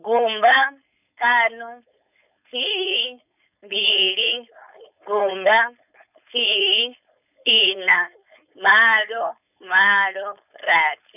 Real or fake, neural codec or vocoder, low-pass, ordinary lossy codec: fake; codec, 16 kHz, 4.8 kbps, FACodec; 3.6 kHz; none